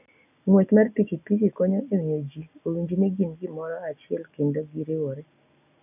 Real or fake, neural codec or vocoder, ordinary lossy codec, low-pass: real; none; none; 3.6 kHz